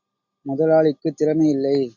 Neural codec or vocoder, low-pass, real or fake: none; 7.2 kHz; real